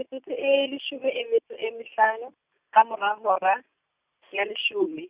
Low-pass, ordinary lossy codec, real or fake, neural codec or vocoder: 3.6 kHz; Opus, 64 kbps; real; none